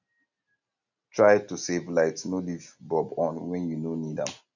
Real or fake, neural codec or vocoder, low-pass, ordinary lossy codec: real; none; 7.2 kHz; none